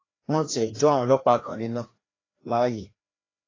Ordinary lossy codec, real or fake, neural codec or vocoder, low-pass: AAC, 32 kbps; fake; codec, 16 kHz, 1 kbps, FreqCodec, larger model; 7.2 kHz